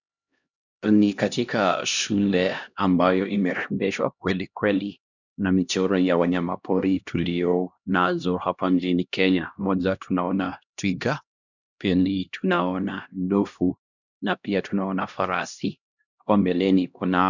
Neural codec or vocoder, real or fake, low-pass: codec, 16 kHz, 1 kbps, X-Codec, HuBERT features, trained on LibriSpeech; fake; 7.2 kHz